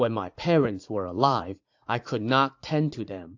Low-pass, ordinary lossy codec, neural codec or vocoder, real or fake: 7.2 kHz; AAC, 48 kbps; vocoder, 44.1 kHz, 80 mel bands, Vocos; fake